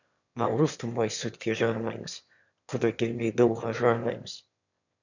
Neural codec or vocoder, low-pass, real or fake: autoencoder, 22.05 kHz, a latent of 192 numbers a frame, VITS, trained on one speaker; 7.2 kHz; fake